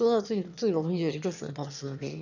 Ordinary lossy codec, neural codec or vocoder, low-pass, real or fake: none; autoencoder, 22.05 kHz, a latent of 192 numbers a frame, VITS, trained on one speaker; 7.2 kHz; fake